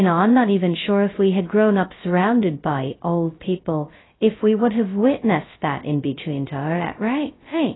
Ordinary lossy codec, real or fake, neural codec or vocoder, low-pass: AAC, 16 kbps; fake; codec, 16 kHz, 0.2 kbps, FocalCodec; 7.2 kHz